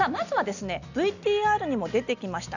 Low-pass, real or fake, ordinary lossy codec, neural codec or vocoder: 7.2 kHz; real; none; none